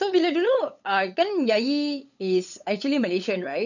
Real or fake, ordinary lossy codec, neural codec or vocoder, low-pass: fake; none; codec, 16 kHz, 8 kbps, FunCodec, trained on LibriTTS, 25 frames a second; 7.2 kHz